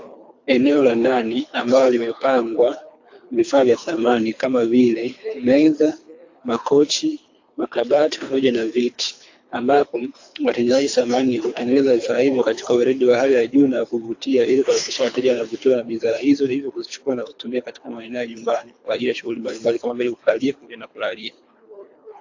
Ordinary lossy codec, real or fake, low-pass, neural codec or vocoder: AAC, 48 kbps; fake; 7.2 kHz; codec, 24 kHz, 3 kbps, HILCodec